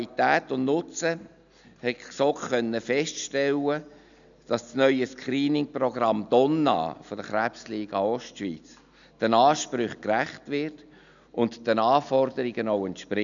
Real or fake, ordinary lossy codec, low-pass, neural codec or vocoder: real; none; 7.2 kHz; none